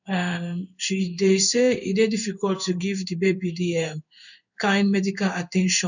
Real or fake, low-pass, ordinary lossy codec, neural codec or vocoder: fake; 7.2 kHz; none; codec, 16 kHz in and 24 kHz out, 1 kbps, XY-Tokenizer